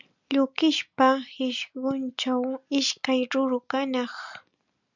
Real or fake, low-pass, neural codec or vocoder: real; 7.2 kHz; none